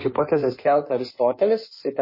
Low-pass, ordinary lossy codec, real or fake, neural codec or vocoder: 5.4 kHz; MP3, 24 kbps; fake; codec, 16 kHz in and 24 kHz out, 1.1 kbps, FireRedTTS-2 codec